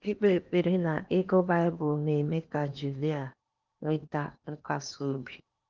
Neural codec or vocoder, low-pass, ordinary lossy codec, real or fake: codec, 16 kHz in and 24 kHz out, 0.8 kbps, FocalCodec, streaming, 65536 codes; 7.2 kHz; Opus, 32 kbps; fake